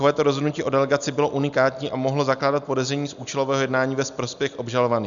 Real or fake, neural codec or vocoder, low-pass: real; none; 7.2 kHz